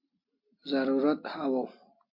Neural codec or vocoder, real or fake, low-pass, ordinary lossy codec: none; real; 5.4 kHz; MP3, 32 kbps